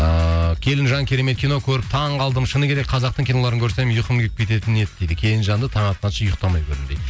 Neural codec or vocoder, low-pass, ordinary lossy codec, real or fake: none; none; none; real